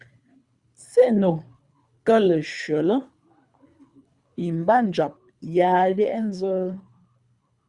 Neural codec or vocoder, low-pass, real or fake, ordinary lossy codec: codec, 24 kHz, 3 kbps, HILCodec; 10.8 kHz; fake; Opus, 64 kbps